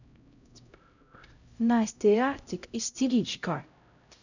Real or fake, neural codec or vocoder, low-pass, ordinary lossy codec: fake; codec, 16 kHz, 0.5 kbps, X-Codec, HuBERT features, trained on LibriSpeech; 7.2 kHz; none